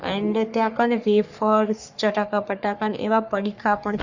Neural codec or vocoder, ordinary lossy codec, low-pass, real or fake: codec, 44.1 kHz, 3.4 kbps, Pupu-Codec; Opus, 64 kbps; 7.2 kHz; fake